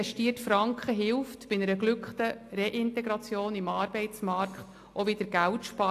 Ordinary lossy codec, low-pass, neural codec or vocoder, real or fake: AAC, 64 kbps; 14.4 kHz; none; real